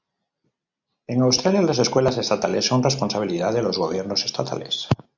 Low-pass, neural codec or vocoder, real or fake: 7.2 kHz; none; real